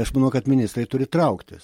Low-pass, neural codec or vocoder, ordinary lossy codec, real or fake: 19.8 kHz; none; MP3, 64 kbps; real